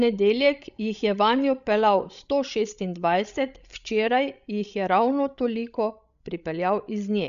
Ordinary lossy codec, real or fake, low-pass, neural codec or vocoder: none; fake; 7.2 kHz; codec, 16 kHz, 16 kbps, FreqCodec, larger model